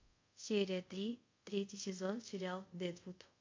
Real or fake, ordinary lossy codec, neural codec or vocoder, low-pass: fake; MP3, 48 kbps; codec, 24 kHz, 0.5 kbps, DualCodec; 7.2 kHz